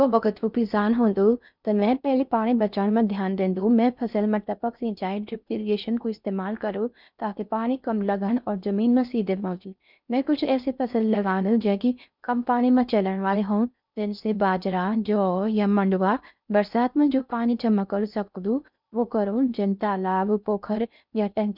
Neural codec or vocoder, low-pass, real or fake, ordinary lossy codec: codec, 16 kHz in and 24 kHz out, 0.6 kbps, FocalCodec, streaming, 4096 codes; 5.4 kHz; fake; none